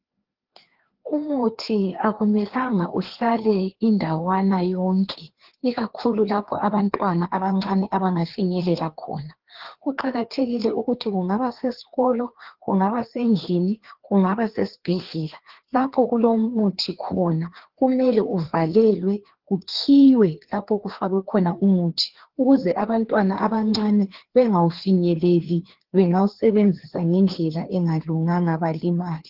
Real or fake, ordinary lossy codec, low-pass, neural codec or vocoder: fake; Opus, 16 kbps; 5.4 kHz; codec, 16 kHz, 2 kbps, FreqCodec, larger model